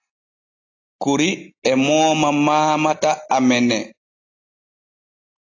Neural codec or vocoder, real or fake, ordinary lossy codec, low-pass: none; real; AAC, 32 kbps; 7.2 kHz